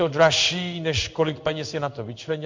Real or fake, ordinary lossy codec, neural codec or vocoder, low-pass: fake; MP3, 64 kbps; codec, 16 kHz in and 24 kHz out, 1 kbps, XY-Tokenizer; 7.2 kHz